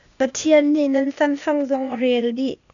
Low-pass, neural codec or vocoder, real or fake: 7.2 kHz; codec, 16 kHz, 0.8 kbps, ZipCodec; fake